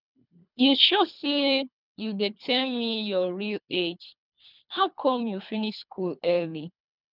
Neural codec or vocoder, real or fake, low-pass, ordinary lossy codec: codec, 24 kHz, 3 kbps, HILCodec; fake; 5.4 kHz; none